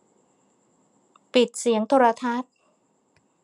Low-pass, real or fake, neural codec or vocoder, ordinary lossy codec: 10.8 kHz; real; none; none